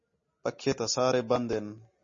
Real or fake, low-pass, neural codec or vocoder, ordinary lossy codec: real; 7.2 kHz; none; MP3, 32 kbps